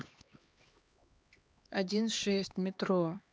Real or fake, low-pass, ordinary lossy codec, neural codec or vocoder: fake; none; none; codec, 16 kHz, 2 kbps, X-Codec, HuBERT features, trained on LibriSpeech